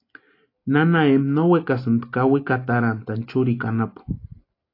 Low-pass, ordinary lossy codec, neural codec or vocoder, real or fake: 5.4 kHz; AAC, 32 kbps; none; real